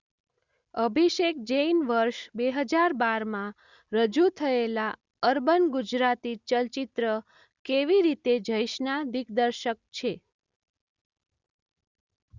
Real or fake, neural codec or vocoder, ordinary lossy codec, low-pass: real; none; Opus, 64 kbps; 7.2 kHz